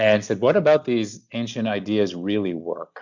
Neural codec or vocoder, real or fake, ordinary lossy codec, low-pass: none; real; MP3, 64 kbps; 7.2 kHz